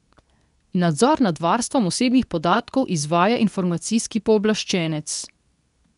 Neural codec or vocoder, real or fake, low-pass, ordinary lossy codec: codec, 24 kHz, 0.9 kbps, WavTokenizer, medium speech release version 2; fake; 10.8 kHz; none